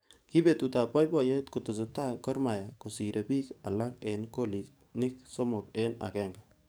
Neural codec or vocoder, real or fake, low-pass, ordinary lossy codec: codec, 44.1 kHz, 7.8 kbps, DAC; fake; none; none